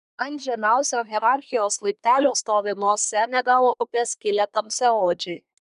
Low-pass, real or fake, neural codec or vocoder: 10.8 kHz; fake; codec, 24 kHz, 1 kbps, SNAC